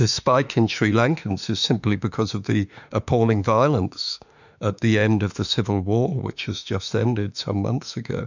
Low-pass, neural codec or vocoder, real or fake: 7.2 kHz; autoencoder, 48 kHz, 32 numbers a frame, DAC-VAE, trained on Japanese speech; fake